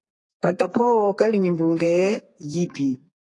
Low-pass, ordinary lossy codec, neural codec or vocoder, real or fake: 10.8 kHz; AAC, 48 kbps; codec, 32 kHz, 1.9 kbps, SNAC; fake